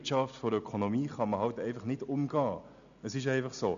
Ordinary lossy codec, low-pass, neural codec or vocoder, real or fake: none; 7.2 kHz; none; real